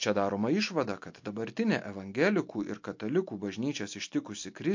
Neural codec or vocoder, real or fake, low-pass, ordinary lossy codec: none; real; 7.2 kHz; MP3, 48 kbps